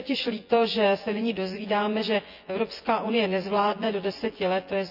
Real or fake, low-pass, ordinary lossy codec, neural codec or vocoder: fake; 5.4 kHz; none; vocoder, 24 kHz, 100 mel bands, Vocos